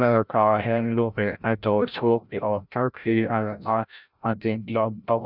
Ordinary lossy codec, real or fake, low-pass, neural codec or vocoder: none; fake; 5.4 kHz; codec, 16 kHz, 0.5 kbps, FreqCodec, larger model